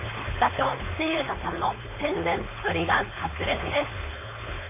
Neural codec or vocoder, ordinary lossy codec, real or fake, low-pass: codec, 16 kHz, 4.8 kbps, FACodec; MP3, 24 kbps; fake; 3.6 kHz